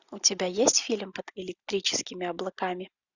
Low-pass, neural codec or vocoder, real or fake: 7.2 kHz; none; real